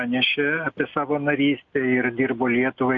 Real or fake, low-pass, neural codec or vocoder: real; 7.2 kHz; none